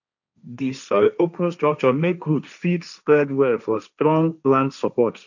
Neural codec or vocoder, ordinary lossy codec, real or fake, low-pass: codec, 16 kHz, 1.1 kbps, Voila-Tokenizer; none; fake; 7.2 kHz